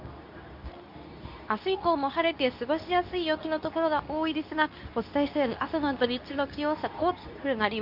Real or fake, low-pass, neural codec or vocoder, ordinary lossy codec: fake; 5.4 kHz; codec, 24 kHz, 0.9 kbps, WavTokenizer, medium speech release version 2; none